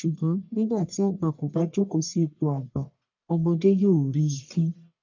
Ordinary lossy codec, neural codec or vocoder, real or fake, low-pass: none; codec, 44.1 kHz, 1.7 kbps, Pupu-Codec; fake; 7.2 kHz